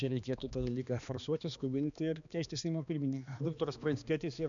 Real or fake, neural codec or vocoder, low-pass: fake; codec, 16 kHz, 2 kbps, X-Codec, HuBERT features, trained on balanced general audio; 7.2 kHz